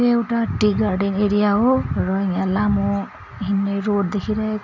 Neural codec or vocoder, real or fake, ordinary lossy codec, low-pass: none; real; none; 7.2 kHz